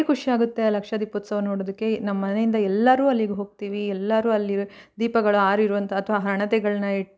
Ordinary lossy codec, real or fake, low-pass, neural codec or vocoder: none; real; none; none